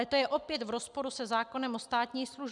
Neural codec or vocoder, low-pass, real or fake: none; 10.8 kHz; real